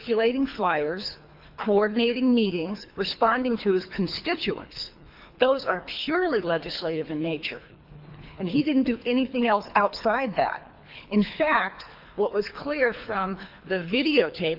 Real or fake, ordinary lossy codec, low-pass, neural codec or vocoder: fake; MP3, 48 kbps; 5.4 kHz; codec, 24 kHz, 3 kbps, HILCodec